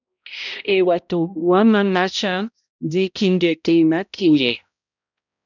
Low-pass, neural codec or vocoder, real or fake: 7.2 kHz; codec, 16 kHz, 0.5 kbps, X-Codec, HuBERT features, trained on balanced general audio; fake